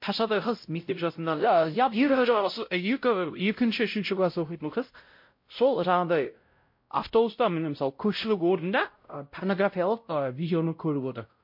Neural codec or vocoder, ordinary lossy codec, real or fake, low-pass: codec, 16 kHz, 0.5 kbps, X-Codec, WavLM features, trained on Multilingual LibriSpeech; MP3, 32 kbps; fake; 5.4 kHz